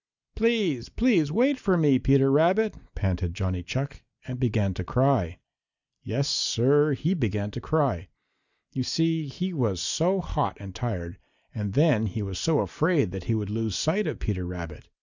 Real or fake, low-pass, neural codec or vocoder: real; 7.2 kHz; none